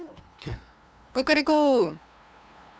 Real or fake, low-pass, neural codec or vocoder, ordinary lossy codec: fake; none; codec, 16 kHz, 2 kbps, FunCodec, trained on LibriTTS, 25 frames a second; none